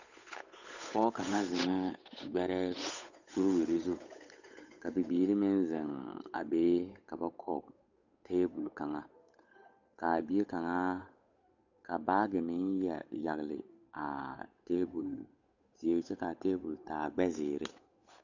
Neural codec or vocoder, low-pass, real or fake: codec, 16 kHz, 8 kbps, FunCodec, trained on Chinese and English, 25 frames a second; 7.2 kHz; fake